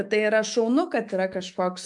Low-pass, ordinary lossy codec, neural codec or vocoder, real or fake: 10.8 kHz; MP3, 96 kbps; autoencoder, 48 kHz, 128 numbers a frame, DAC-VAE, trained on Japanese speech; fake